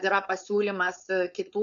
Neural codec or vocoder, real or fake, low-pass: codec, 16 kHz, 4.8 kbps, FACodec; fake; 7.2 kHz